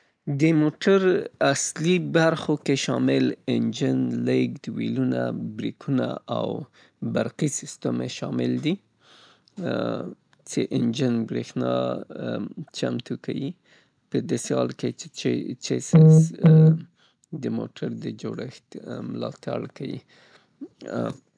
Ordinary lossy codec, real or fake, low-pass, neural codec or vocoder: none; real; 9.9 kHz; none